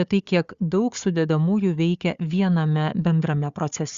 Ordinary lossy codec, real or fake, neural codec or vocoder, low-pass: Opus, 64 kbps; fake; codec, 16 kHz, 4 kbps, FunCodec, trained on Chinese and English, 50 frames a second; 7.2 kHz